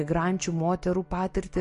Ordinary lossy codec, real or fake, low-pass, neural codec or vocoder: MP3, 48 kbps; real; 10.8 kHz; none